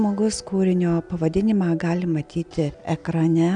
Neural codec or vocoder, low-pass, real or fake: none; 9.9 kHz; real